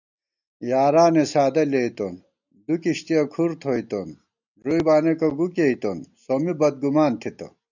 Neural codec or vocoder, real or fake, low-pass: none; real; 7.2 kHz